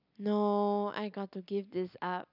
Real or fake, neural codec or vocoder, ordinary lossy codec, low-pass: real; none; none; 5.4 kHz